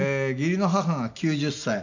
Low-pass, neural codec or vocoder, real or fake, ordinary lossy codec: 7.2 kHz; none; real; none